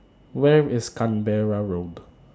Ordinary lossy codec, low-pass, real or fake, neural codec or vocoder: none; none; real; none